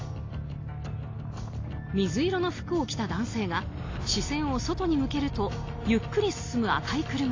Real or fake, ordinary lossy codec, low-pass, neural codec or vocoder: real; AAC, 32 kbps; 7.2 kHz; none